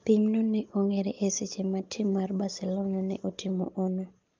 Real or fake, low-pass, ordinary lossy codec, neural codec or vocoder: real; 7.2 kHz; Opus, 24 kbps; none